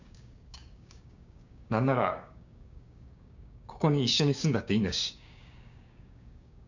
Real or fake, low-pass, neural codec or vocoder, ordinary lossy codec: fake; 7.2 kHz; codec, 16 kHz, 6 kbps, DAC; none